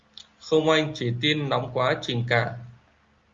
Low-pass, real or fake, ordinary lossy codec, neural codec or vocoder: 7.2 kHz; real; Opus, 32 kbps; none